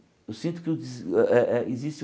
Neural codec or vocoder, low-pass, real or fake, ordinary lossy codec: none; none; real; none